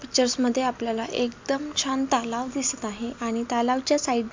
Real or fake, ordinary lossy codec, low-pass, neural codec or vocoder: real; MP3, 64 kbps; 7.2 kHz; none